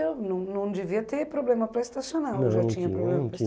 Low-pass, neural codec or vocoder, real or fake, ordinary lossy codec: none; none; real; none